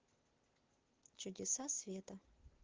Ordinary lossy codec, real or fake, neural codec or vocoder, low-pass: Opus, 16 kbps; real; none; 7.2 kHz